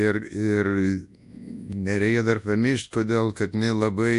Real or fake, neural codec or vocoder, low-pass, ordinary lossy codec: fake; codec, 24 kHz, 0.9 kbps, WavTokenizer, large speech release; 10.8 kHz; AAC, 64 kbps